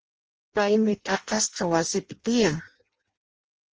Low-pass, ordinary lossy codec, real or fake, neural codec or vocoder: 7.2 kHz; Opus, 24 kbps; fake; codec, 16 kHz in and 24 kHz out, 0.6 kbps, FireRedTTS-2 codec